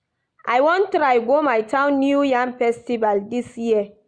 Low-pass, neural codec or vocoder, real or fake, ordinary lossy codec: 9.9 kHz; none; real; Opus, 64 kbps